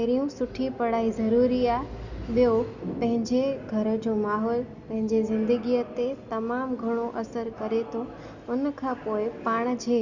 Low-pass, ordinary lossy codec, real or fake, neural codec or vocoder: 7.2 kHz; Opus, 64 kbps; real; none